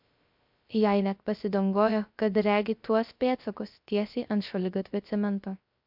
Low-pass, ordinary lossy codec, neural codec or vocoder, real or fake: 5.4 kHz; MP3, 48 kbps; codec, 16 kHz, 0.3 kbps, FocalCodec; fake